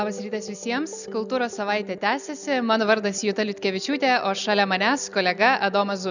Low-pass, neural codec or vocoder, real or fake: 7.2 kHz; none; real